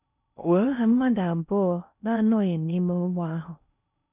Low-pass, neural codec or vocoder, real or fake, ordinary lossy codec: 3.6 kHz; codec, 16 kHz in and 24 kHz out, 0.6 kbps, FocalCodec, streaming, 4096 codes; fake; none